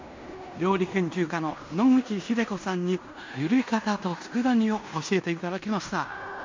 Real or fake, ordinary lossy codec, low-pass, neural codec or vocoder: fake; MP3, 64 kbps; 7.2 kHz; codec, 16 kHz in and 24 kHz out, 0.9 kbps, LongCat-Audio-Codec, fine tuned four codebook decoder